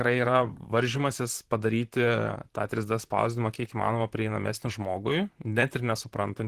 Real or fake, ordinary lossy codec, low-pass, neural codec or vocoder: fake; Opus, 16 kbps; 14.4 kHz; vocoder, 44.1 kHz, 128 mel bands, Pupu-Vocoder